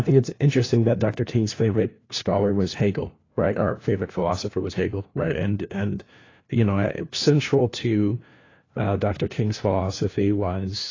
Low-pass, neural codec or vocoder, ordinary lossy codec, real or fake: 7.2 kHz; codec, 16 kHz, 1 kbps, FunCodec, trained on LibriTTS, 50 frames a second; AAC, 32 kbps; fake